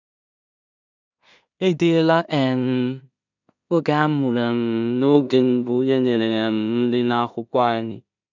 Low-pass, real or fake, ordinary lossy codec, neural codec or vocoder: 7.2 kHz; fake; none; codec, 16 kHz in and 24 kHz out, 0.4 kbps, LongCat-Audio-Codec, two codebook decoder